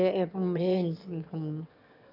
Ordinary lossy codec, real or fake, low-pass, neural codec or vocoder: none; fake; 5.4 kHz; autoencoder, 22.05 kHz, a latent of 192 numbers a frame, VITS, trained on one speaker